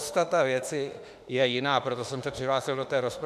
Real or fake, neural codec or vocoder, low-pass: fake; autoencoder, 48 kHz, 32 numbers a frame, DAC-VAE, trained on Japanese speech; 14.4 kHz